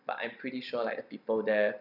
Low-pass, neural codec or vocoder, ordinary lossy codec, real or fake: 5.4 kHz; none; none; real